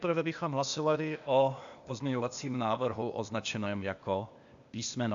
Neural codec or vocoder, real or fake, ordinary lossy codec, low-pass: codec, 16 kHz, 0.8 kbps, ZipCodec; fake; AAC, 64 kbps; 7.2 kHz